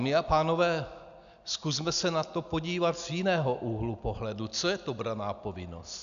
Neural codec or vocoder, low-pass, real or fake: none; 7.2 kHz; real